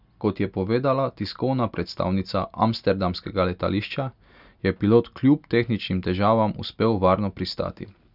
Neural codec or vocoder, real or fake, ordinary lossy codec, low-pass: none; real; none; 5.4 kHz